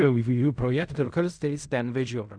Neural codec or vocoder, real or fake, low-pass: codec, 16 kHz in and 24 kHz out, 0.4 kbps, LongCat-Audio-Codec, fine tuned four codebook decoder; fake; 9.9 kHz